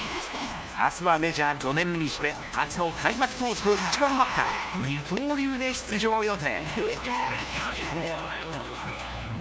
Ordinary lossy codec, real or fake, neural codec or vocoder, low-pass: none; fake; codec, 16 kHz, 1 kbps, FunCodec, trained on LibriTTS, 50 frames a second; none